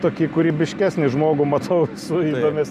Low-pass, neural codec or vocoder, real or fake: 14.4 kHz; none; real